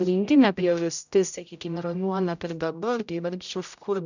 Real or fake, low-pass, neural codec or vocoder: fake; 7.2 kHz; codec, 16 kHz, 0.5 kbps, X-Codec, HuBERT features, trained on general audio